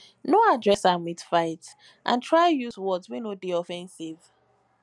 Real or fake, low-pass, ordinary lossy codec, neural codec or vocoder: real; 10.8 kHz; none; none